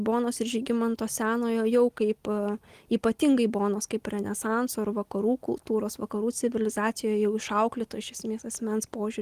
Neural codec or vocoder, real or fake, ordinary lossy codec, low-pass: none; real; Opus, 24 kbps; 14.4 kHz